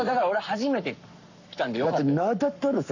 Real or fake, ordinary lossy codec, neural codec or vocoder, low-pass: fake; none; codec, 44.1 kHz, 7.8 kbps, Pupu-Codec; 7.2 kHz